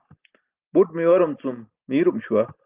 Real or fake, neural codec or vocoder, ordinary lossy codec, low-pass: real; none; Opus, 32 kbps; 3.6 kHz